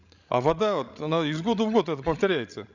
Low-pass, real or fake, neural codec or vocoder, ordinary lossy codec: 7.2 kHz; fake; codec, 16 kHz, 16 kbps, FreqCodec, larger model; none